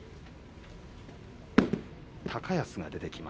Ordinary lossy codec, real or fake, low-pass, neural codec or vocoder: none; real; none; none